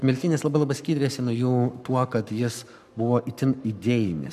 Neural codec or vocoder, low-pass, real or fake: codec, 44.1 kHz, 7.8 kbps, Pupu-Codec; 14.4 kHz; fake